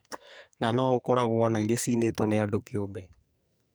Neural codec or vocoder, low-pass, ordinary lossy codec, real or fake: codec, 44.1 kHz, 2.6 kbps, SNAC; none; none; fake